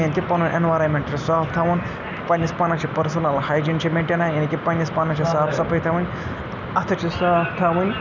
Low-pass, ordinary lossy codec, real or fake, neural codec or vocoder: 7.2 kHz; none; real; none